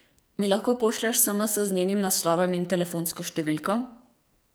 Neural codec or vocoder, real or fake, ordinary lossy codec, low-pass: codec, 44.1 kHz, 2.6 kbps, SNAC; fake; none; none